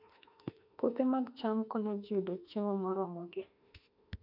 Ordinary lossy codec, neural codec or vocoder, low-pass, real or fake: none; autoencoder, 48 kHz, 32 numbers a frame, DAC-VAE, trained on Japanese speech; 5.4 kHz; fake